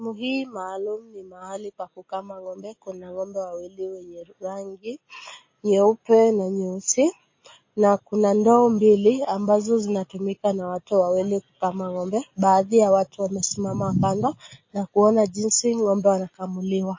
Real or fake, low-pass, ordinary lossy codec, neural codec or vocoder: real; 7.2 kHz; MP3, 32 kbps; none